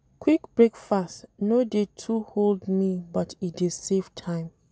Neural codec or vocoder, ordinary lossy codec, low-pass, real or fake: none; none; none; real